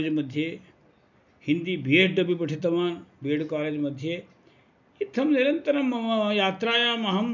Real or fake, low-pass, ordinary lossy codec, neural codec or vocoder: real; 7.2 kHz; none; none